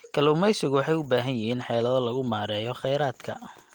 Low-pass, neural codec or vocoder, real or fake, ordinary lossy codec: 19.8 kHz; none; real; Opus, 24 kbps